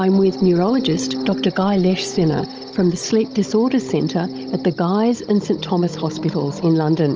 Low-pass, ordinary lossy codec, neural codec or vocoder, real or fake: 7.2 kHz; Opus, 24 kbps; codec, 16 kHz, 16 kbps, FunCodec, trained on Chinese and English, 50 frames a second; fake